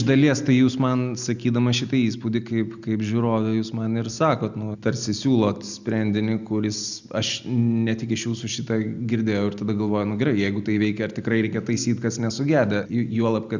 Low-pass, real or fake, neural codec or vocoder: 7.2 kHz; real; none